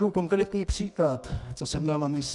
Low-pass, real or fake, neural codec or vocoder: 10.8 kHz; fake; codec, 24 kHz, 0.9 kbps, WavTokenizer, medium music audio release